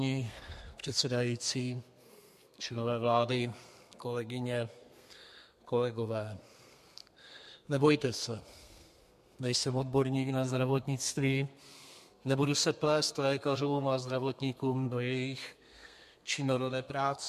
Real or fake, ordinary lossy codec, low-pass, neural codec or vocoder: fake; MP3, 64 kbps; 14.4 kHz; codec, 32 kHz, 1.9 kbps, SNAC